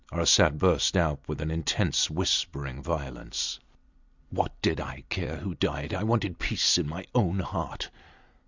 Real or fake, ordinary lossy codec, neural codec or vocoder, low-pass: real; Opus, 64 kbps; none; 7.2 kHz